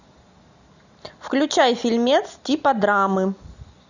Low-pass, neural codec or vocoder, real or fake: 7.2 kHz; none; real